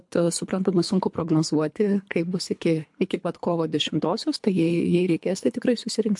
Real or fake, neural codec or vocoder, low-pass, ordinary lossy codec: fake; codec, 24 kHz, 3 kbps, HILCodec; 10.8 kHz; MP3, 64 kbps